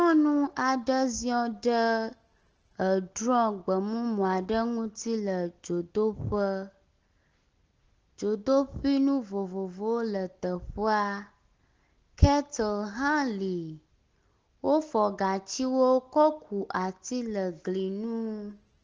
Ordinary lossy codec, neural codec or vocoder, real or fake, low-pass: Opus, 16 kbps; none; real; 7.2 kHz